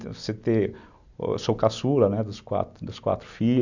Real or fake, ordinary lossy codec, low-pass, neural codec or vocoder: real; none; 7.2 kHz; none